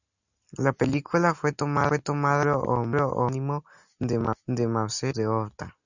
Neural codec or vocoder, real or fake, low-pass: none; real; 7.2 kHz